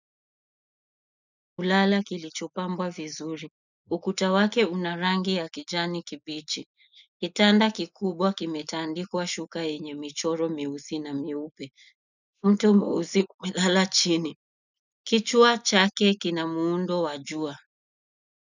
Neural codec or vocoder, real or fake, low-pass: none; real; 7.2 kHz